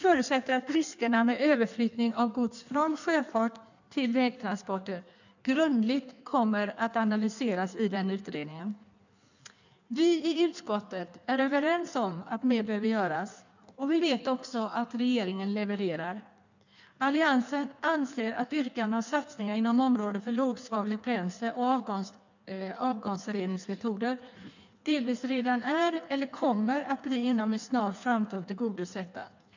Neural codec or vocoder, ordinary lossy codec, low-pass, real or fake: codec, 16 kHz in and 24 kHz out, 1.1 kbps, FireRedTTS-2 codec; none; 7.2 kHz; fake